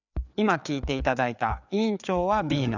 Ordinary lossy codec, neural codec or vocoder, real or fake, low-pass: none; codec, 16 kHz, 4 kbps, FreqCodec, larger model; fake; 7.2 kHz